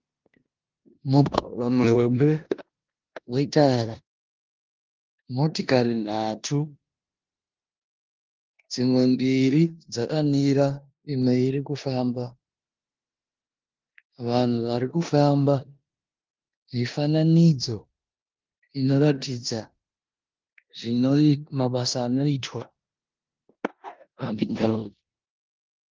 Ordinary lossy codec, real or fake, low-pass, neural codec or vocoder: Opus, 32 kbps; fake; 7.2 kHz; codec, 16 kHz in and 24 kHz out, 0.9 kbps, LongCat-Audio-Codec, four codebook decoder